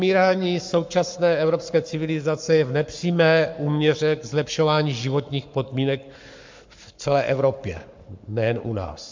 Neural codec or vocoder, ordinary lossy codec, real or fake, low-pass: codec, 44.1 kHz, 7.8 kbps, Pupu-Codec; MP3, 64 kbps; fake; 7.2 kHz